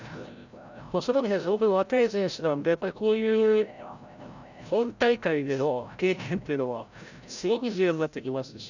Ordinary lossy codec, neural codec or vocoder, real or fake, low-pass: none; codec, 16 kHz, 0.5 kbps, FreqCodec, larger model; fake; 7.2 kHz